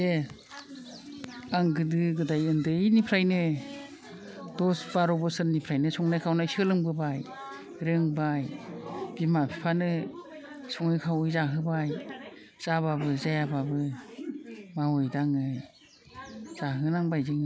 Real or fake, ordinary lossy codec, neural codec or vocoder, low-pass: real; none; none; none